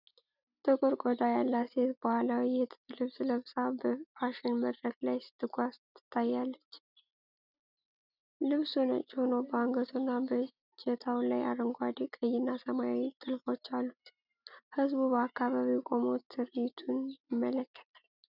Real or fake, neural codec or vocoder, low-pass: real; none; 5.4 kHz